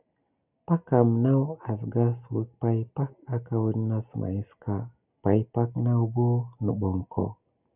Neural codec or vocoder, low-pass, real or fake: none; 3.6 kHz; real